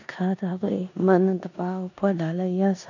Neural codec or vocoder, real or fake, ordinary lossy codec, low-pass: codec, 16 kHz in and 24 kHz out, 0.9 kbps, LongCat-Audio-Codec, four codebook decoder; fake; none; 7.2 kHz